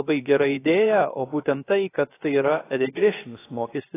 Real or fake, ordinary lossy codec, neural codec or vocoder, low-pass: fake; AAC, 16 kbps; codec, 16 kHz, 0.3 kbps, FocalCodec; 3.6 kHz